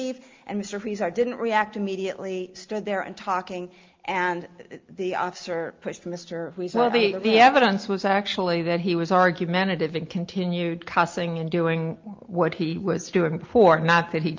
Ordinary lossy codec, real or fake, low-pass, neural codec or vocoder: Opus, 32 kbps; real; 7.2 kHz; none